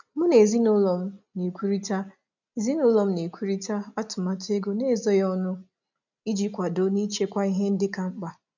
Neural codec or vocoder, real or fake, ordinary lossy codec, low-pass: none; real; none; 7.2 kHz